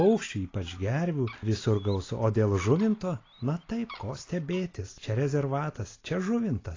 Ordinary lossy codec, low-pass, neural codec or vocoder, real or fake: AAC, 32 kbps; 7.2 kHz; none; real